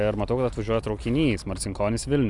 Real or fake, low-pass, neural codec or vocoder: real; 10.8 kHz; none